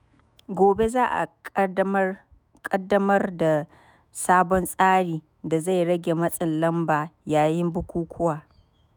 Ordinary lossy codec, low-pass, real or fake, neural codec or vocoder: none; none; fake; autoencoder, 48 kHz, 128 numbers a frame, DAC-VAE, trained on Japanese speech